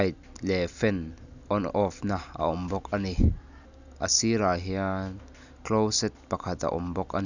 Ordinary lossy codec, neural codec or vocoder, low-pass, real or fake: none; none; 7.2 kHz; real